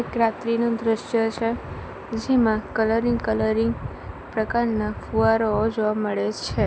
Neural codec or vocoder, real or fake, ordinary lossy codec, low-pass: none; real; none; none